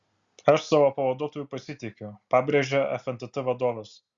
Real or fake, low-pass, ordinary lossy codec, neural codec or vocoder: real; 7.2 kHz; Opus, 64 kbps; none